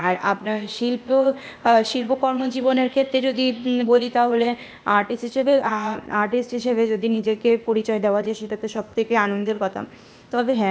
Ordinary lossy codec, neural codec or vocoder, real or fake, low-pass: none; codec, 16 kHz, 0.8 kbps, ZipCodec; fake; none